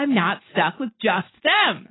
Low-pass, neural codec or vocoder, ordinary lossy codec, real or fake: 7.2 kHz; vocoder, 44.1 kHz, 128 mel bands every 512 samples, BigVGAN v2; AAC, 16 kbps; fake